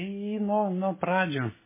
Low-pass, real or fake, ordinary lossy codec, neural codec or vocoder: 3.6 kHz; real; MP3, 16 kbps; none